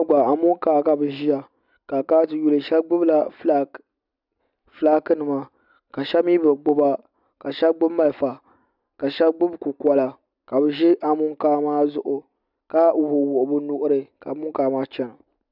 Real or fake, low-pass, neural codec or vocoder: real; 5.4 kHz; none